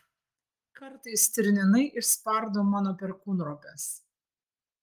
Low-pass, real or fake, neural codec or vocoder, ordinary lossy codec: 14.4 kHz; real; none; Opus, 24 kbps